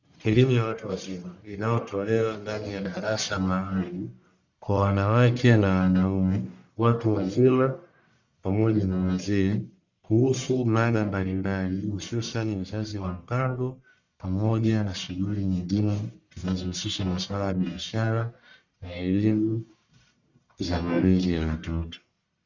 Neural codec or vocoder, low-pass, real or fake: codec, 44.1 kHz, 1.7 kbps, Pupu-Codec; 7.2 kHz; fake